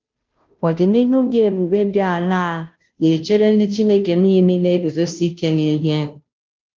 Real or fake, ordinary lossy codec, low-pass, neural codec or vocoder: fake; Opus, 32 kbps; 7.2 kHz; codec, 16 kHz, 0.5 kbps, FunCodec, trained on Chinese and English, 25 frames a second